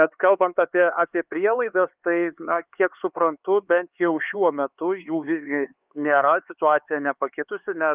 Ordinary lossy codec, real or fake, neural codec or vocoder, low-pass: Opus, 24 kbps; fake; codec, 16 kHz, 4 kbps, X-Codec, HuBERT features, trained on LibriSpeech; 3.6 kHz